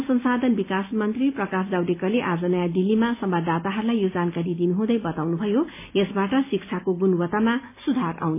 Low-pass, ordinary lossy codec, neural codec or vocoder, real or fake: 3.6 kHz; MP3, 24 kbps; none; real